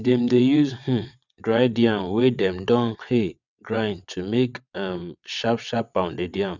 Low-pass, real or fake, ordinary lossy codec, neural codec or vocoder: 7.2 kHz; fake; none; vocoder, 22.05 kHz, 80 mel bands, WaveNeXt